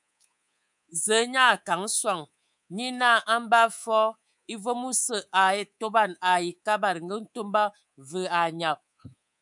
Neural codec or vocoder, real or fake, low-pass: codec, 24 kHz, 3.1 kbps, DualCodec; fake; 10.8 kHz